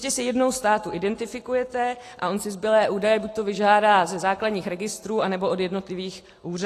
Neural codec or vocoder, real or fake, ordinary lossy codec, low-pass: none; real; AAC, 48 kbps; 14.4 kHz